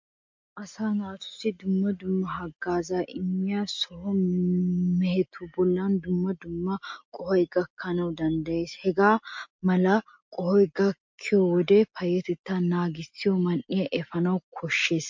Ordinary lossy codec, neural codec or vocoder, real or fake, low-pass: MP3, 48 kbps; none; real; 7.2 kHz